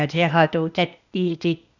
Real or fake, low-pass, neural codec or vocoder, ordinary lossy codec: fake; 7.2 kHz; codec, 16 kHz, 0.8 kbps, ZipCodec; none